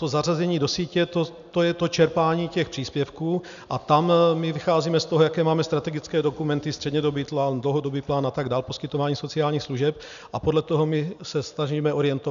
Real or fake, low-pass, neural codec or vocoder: real; 7.2 kHz; none